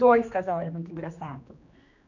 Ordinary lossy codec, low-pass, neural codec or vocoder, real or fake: none; 7.2 kHz; codec, 16 kHz, 2 kbps, X-Codec, HuBERT features, trained on general audio; fake